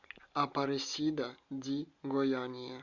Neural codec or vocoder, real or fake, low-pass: none; real; 7.2 kHz